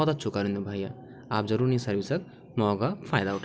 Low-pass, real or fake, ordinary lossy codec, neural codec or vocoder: none; real; none; none